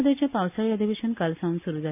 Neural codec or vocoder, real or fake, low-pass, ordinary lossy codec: none; real; 3.6 kHz; none